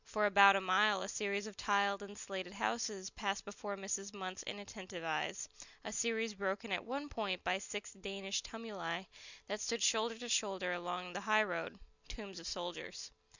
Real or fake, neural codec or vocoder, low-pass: real; none; 7.2 kHz